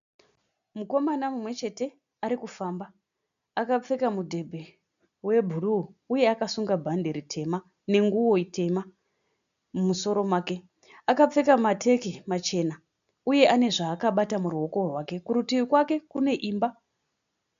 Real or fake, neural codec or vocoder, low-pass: real; none; 7.2 kHz